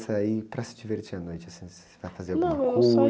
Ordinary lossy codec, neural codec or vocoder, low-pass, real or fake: none; none; none; real